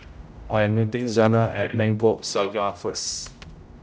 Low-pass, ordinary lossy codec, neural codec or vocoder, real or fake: none; none; codec, 16 kHz, 0.5 kbps, X-Codec, HuBERT features, trained on general audio; fake